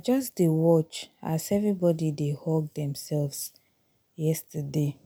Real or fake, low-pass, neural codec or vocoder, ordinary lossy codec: real; none; none; none